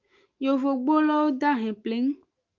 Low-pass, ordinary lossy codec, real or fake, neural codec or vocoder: 7.2 kHz; Opus, 32 kbps; fake; autoencoder, 48 kHz, 128 numbers a frame, DAC-VAE, trained on Japanese speech